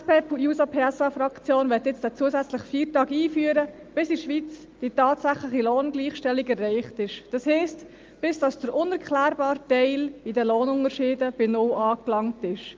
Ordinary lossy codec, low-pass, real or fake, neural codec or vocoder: Opus, 24 kbps; 7.2 kHz; real; none